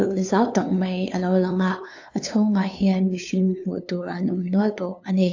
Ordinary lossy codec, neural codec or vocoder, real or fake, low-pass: AAC, 48 kbps; codec, 16 kHz, 2 kbps, FunCodec, trained on LibriTTS, 25 frames a second; fake; 7.2 kHz